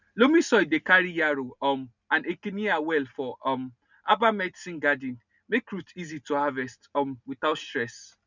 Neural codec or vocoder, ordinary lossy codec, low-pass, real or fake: none; none; 7.2 kHz; real